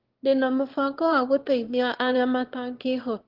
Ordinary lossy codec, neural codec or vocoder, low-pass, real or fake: Opus, 16 kbps; autoencoder, 22.05 kHz, a latent of 192 numbers a frame, VITS, trained on one speaker; 5.4 kHz; fake